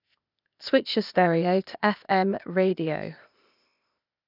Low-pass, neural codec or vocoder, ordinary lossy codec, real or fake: 5.4 kHz; codec, 16 kHz, 0.8 kbps, ZipCodec; none; fake